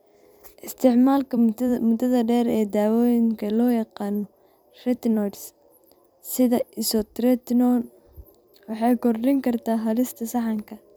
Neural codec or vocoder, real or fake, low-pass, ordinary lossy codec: vocoder, 44.1 kHz, 128 mel bands every 256 samples, BigVGAN v2; fake; none; none